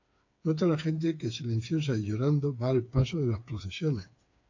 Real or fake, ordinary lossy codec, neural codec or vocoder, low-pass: fake; AAC, 48 kbps; codec, 16 kHz, 4 kbps, FreqCodec, smaller model; 7.2 kHz